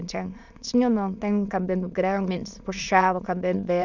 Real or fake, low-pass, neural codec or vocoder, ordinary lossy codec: fake; 7.2 kHz; autoencoder, 22.05 kHz, a latent of 192 numbers a frame, VITS, trained on many speakers; none